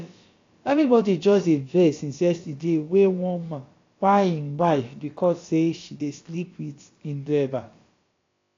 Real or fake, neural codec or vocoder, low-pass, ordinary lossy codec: fake; codec, 16 kHz, about 1 kbps, DyCAST, with the encoder's durations; 7.2 kHz; AAC, 48 kbps